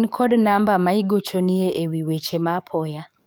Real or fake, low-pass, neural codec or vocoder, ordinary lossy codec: fake; none; codec, 44.1 kHz, 7.8 kbps, DAC; none